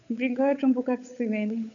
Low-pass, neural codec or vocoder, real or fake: 7.2 kHz; codec, 16 kHz, 8 kbps, FunCodec, trained on Chinese and English, 25 frames a second; fake